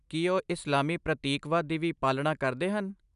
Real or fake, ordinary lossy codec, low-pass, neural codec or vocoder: real; none; 10.8 kHz; none